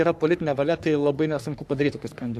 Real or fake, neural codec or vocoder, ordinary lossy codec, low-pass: fake; codec, 44.1 kHz, 3.4 kbps, Pupu-Codec; AAC, 96 kbps; 14.4 kHz